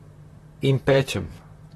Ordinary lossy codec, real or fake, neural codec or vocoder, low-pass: AAC, 32 kbps; fake; vocoder, 44.1 kHz, 128 mel bands, Pupu-Vocoder; 19.8 kHz